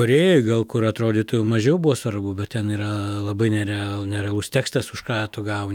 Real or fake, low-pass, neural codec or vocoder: fake; 19.8 kHz; autoencoder, 48 kHz, 128 numbers a frame, DAC-VAE, trained on Japanese speech